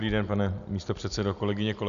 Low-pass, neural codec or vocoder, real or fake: 7.2 kHz; none; real